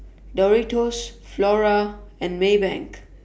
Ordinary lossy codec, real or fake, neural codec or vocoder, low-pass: none; real; none; none